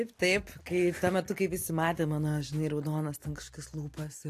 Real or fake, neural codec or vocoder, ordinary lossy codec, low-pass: real; none; AAC, 64 kbps; 14.4 kHz